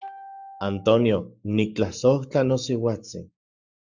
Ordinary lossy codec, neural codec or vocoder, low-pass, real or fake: Opus, 64 kbps; codec, 44.1 kHz, 7.8 kbps, Pupu-Codec; 7.2 kHz; fake